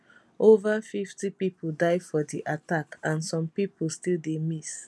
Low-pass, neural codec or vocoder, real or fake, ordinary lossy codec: none; none; real; none